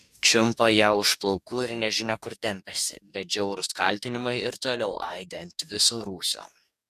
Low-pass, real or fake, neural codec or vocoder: 14.4 kHz; fake; codec, 44.1 kHz, 2.6 kbps, DAC